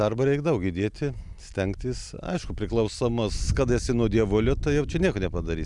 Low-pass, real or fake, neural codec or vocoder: 10.8 kHz; real; none